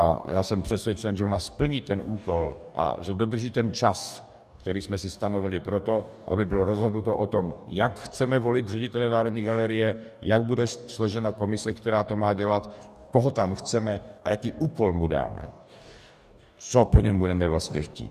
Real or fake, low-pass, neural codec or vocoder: fake; 14.4 kHz; codec, 44.1 kHz, 2.6 kbps, DAC